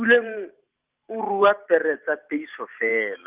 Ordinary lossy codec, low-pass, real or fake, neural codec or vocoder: Opus, 32 kbps; 3.6 kHz; fake; vocoder, 44.1 kHz, 128 mel bands every 512 samples, BigVGAN v2